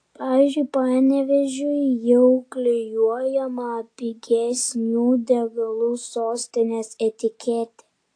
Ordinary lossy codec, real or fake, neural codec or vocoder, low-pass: AAC, 48 kbps; real; none; 9.9 kHz